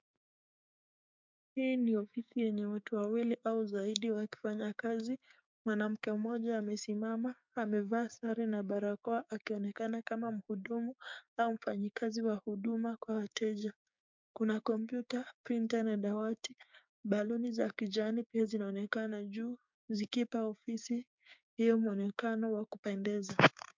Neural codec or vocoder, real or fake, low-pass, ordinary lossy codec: codec, 16 kHz, 6 kbps, DAC; fake; 7.2 kHz; MP3, 64 kbps